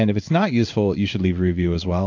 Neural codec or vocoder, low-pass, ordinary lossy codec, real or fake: codec, 16 kHz in and 24 kHz out, 1 kbps, XY-Tokenizer; 7.2 kHz; AAC, 48 kbps; fake